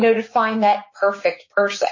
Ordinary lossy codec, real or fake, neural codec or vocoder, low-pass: MP3, 32 kbps; fake; codec, 16 kHz, 6 kbps, DAC; 7.2 kHz